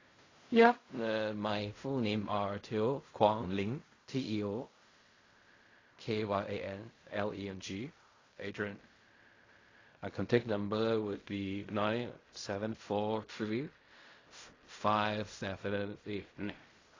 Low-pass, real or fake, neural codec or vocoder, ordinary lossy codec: 7.2 kHz; fake; codec, 16 kHz in and 24 kHz out, 0.4 kbps, LongCat-Audio-Codec, fine tuned four codebook decoder; AAC, 32 kbps